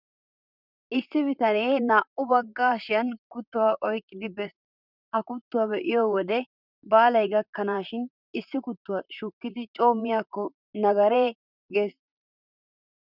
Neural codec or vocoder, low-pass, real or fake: vocoder, 44.1 kHz, 128 mel bands, Pupu-Vocoder; 5.4 kHz; fake